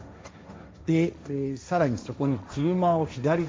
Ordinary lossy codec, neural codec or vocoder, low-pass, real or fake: none; codec, 16 kHz, 1.1 kbps, Voila-Tokenizer; none; fake